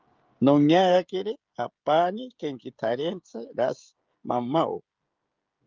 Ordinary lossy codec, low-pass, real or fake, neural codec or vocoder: Opus, 32 kbps; 7.2 kHz; fake; codec, 16 kHz, 16 kbps, FreqCodec, smaller model